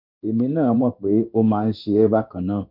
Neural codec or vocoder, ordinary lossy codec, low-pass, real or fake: codec, 16 kHz in and 24 kHz out, 1 kbps, XY-Tokenizer; none; 5.4 kHz; fake